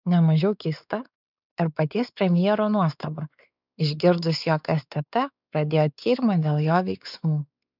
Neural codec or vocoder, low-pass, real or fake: codec, 16 kHz, 4 kbps, X-Codec, WavLM features, trained on Multilingual LibriSpeech; 5.4 kHz; fake